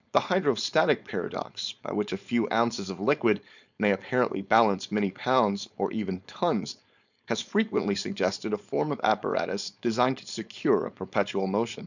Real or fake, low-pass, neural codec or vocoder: fake; 7.2 kHz; codec, 16 kHz, 4.8 kbps, FACodec